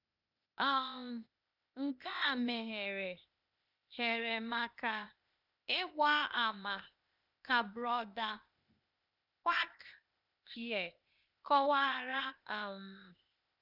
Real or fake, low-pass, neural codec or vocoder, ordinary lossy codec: fake; 5.4 kHz; codec, 16 kHz, 0.8 kbps, ZipCodec; none